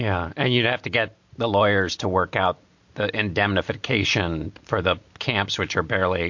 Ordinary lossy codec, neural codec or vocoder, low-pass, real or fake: MP3, 48 kbps; none; 7.2 kHz; real